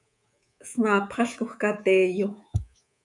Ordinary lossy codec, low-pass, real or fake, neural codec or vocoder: MP3, 96 kbps; 10.8 kHz; fake; codec, 24 kHz, 3.1 kbps, DualCodec